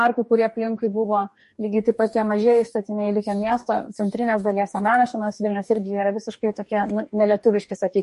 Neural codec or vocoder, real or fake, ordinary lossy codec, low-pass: codec, 44.1 kHz, 2.6 kbps, DAC; fake; MP3, 48 kbps; 14.4 kHz